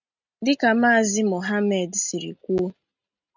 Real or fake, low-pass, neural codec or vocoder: real; 7.2 kHz; none